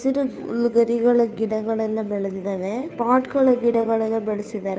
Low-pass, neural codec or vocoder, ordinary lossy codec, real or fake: none; codec, 16 kHz, 2 kbps, FunCodec, trained on Chinese and English, 25 frames a second; none; fake